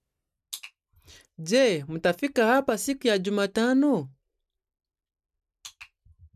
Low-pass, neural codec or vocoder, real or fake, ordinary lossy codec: 14.4 kHz; none; real; none